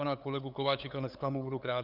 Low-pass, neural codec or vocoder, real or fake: 5.4 kHz; codec, 16 kHz, 8 kbps, FunCodec, trained on LibriTTS, 25 frames a second; fake